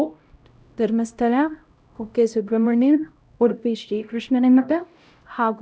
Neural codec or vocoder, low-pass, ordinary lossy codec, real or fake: codec, 16 kHz, 0.5 kbps, X-Codec, HuBERT features, trained on LibriSpeech; none; none; fake